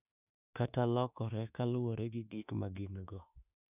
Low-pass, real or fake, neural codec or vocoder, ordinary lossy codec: 3.6 kHz; fake; autoencoder, 48 kHz, 32 numbers a frame, DAC-VAE, trained on Japanese speech; none